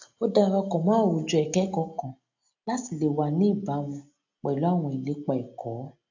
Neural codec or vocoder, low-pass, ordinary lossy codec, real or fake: none; 7.2 kHz; AAC, 48 kbps; real